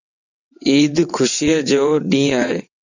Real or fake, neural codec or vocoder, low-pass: fake; vocoder, 22.05 kHz, 80 mel bands, WaveNeXt; 7.2 kHz